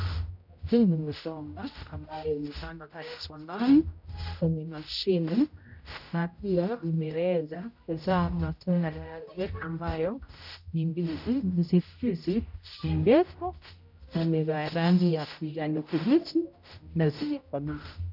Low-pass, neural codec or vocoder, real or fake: 5.4 kHz; codec, 16 kHz, 0.5 kbps, X-Codec, HuBERT features, trained on general audio; fake